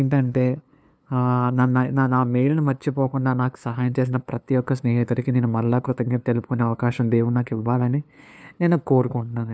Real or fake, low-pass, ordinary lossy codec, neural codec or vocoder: fake; none; none; codec, 16 kHz, 2 kbps, FunCodec, trained on LibriTTS, 25 frames a second